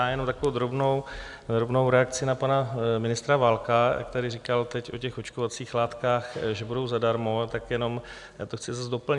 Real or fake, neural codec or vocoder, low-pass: real; none; 10.8 kHz